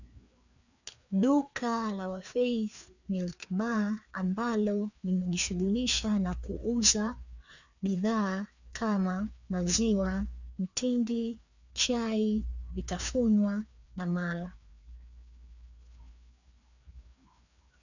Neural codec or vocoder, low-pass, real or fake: codec, 16 kHz, 2 kbps, FreqCodec, larger model; 7.2 kHz; fake